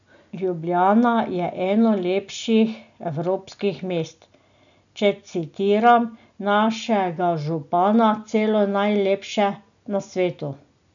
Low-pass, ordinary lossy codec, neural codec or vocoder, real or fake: 7.2 kHz; none; none; real